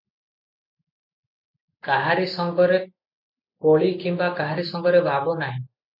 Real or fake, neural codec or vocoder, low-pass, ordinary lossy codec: real; none; 5.4 kHz; MP3, 48 kbps